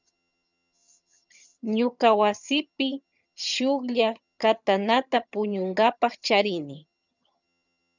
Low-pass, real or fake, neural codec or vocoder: 7.2 kHz; fake; vocoder, 22.05 kHz, 80 mel bands, HiFi-GAN